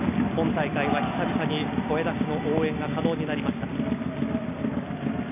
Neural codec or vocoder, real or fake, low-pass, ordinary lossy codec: none; real; 3.6 kHz; none